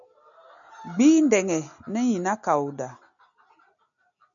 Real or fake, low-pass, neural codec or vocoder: real; 7.2 kHz; none